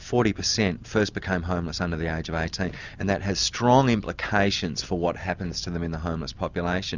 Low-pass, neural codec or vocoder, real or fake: 7.2 kHz; none; real